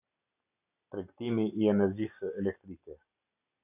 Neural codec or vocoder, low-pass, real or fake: none; 3.6 kHz; real